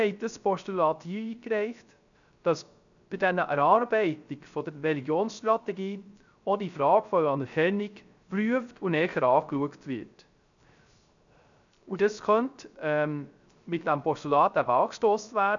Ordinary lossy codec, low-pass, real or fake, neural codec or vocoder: none; 7.2 kHz; fake; codec, 16 kHz, 0.3 kbps, FocalCodec